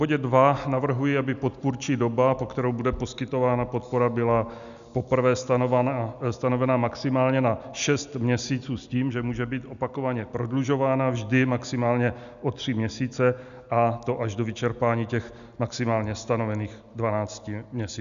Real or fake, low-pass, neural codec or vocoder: real; 7.2 kHz; none